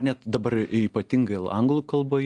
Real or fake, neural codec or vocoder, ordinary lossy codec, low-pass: real; none; Opus, 32 kbps; 10.8 kHz